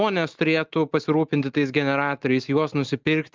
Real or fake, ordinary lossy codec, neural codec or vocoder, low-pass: real; Opus, 32 kbps; none; 7.2 kHz